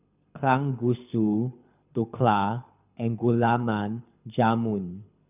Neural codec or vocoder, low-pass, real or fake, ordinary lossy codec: codec, 24 kHz, 6 kbps, HILCodec; 3.6 kHz; fake; none